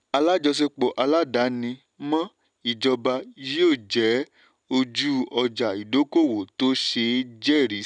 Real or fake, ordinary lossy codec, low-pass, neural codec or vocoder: real; none; 9.9 kHz; none